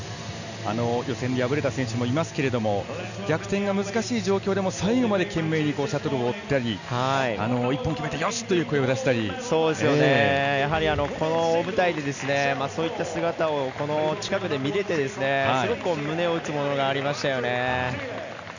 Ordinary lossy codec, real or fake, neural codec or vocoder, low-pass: none; real; none; 7.2 kHz